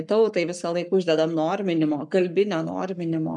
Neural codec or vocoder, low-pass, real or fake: codec, 44.1 kHz, 7.8 kbps, Pupu-Codec; 10.8 kHz; fake